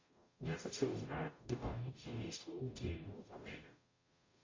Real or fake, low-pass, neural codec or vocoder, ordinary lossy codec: fake; 7.2 kHz; codec, 44.1 kHz, 0.9 kbps, DAC; MP3, 48 kbps